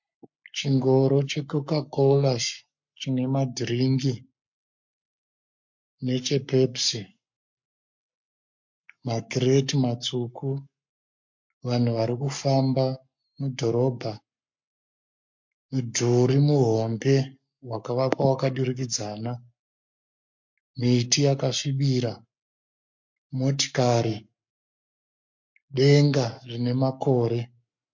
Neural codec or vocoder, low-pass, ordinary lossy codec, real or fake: codec, 44.1 kHz, 7.8 kbps, Pupu-Codec; 7.2 kHz; MP3, 48 kbps; fake